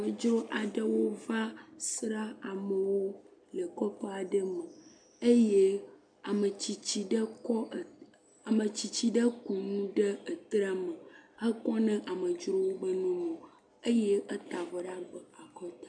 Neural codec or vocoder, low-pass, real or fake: none; 9.9 kHz; real